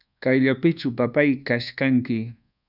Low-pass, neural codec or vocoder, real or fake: 5.4 kHz; codec, 24 kHz, 1.2 kbps, DualCodec; fake